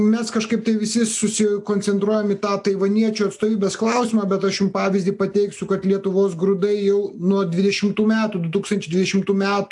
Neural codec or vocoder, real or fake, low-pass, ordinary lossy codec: none; real; 10.8 kHz; AAC, 64 kbps